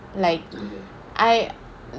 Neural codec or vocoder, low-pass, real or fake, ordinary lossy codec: none; none; real; none